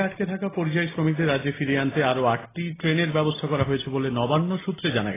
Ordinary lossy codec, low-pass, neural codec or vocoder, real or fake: AAC, 16 kbps; 3.6 kHz; none; real